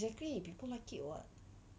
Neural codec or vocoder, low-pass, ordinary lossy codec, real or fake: none; none; none; real